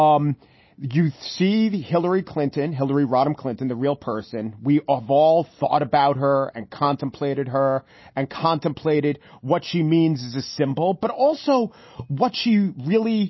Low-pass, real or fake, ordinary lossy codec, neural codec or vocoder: 7.2 kHz; real; MP3, 24 kbps; none